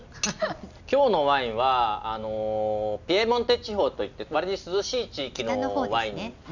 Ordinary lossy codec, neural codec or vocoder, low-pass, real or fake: none; none; 7.2 kHz; real